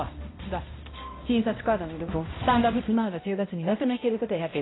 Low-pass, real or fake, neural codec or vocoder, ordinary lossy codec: 7.2 kHz; fake; codec, 16 kHz, 0.5 kbps, X-Codec, HuBERT features, trained on balanced general audio; AAC, 16 kbps